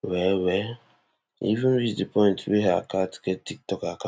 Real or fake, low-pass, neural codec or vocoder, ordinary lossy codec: real; none; none; none